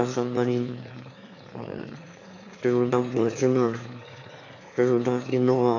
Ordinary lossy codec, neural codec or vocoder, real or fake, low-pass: AAC, 48 kbps; autoencoder, 22.05 kHz, a latent of 192 numbers a frame, VITS, trained on one speaker; fake; 7.2 kHz